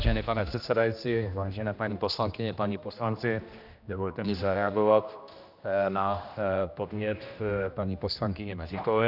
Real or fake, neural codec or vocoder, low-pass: fake; codec, 16 kHz, 1 kbps, X-Codec, HuBERT features, trained on general audio; 5.4 kHz